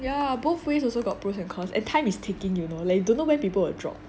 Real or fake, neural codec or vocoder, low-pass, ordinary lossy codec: real; none; none; none